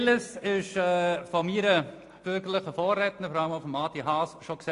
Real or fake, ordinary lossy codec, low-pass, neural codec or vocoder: real; AAC, 48 kbps; 10.8 kHz; none